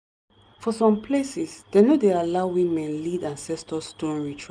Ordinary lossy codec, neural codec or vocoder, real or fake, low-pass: none; none; real; none